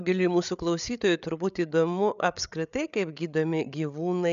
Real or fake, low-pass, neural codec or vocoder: fake; 7.2 kHz; codec, 16 kHz, 8 kbps, FreqCodec, larger model